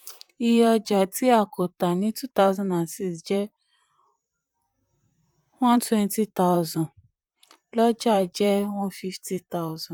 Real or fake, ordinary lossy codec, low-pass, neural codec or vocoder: fake; none; 19.8 kHz; vocoder, 44.1 kHz, 128 mel bands, Pupu-Vocoder